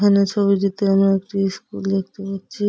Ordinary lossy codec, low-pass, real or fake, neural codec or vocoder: none; none; real; none